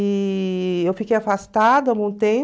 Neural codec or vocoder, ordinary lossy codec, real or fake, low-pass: none; none; real; none